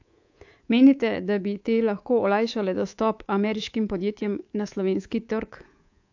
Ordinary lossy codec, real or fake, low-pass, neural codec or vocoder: AAC, 48 kbps; fake; 7.2 kHz; codec, 24 kHz, 3.1 kbps, DualCodec